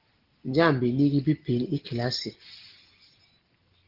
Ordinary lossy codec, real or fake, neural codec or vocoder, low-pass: Opus, 16 kbps; real; none; 5.4 kHz